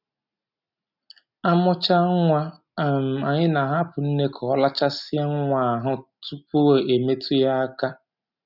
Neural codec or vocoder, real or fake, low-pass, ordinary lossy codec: none; real; 5.4 kHz; none